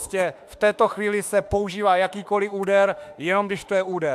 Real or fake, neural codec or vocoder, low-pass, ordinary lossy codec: fake; autoencoder, 48 kHz, 32 numbers a frame, DAC-VAE, trained on Japanese speech; 14.4 kHz; AAC, 96 kbps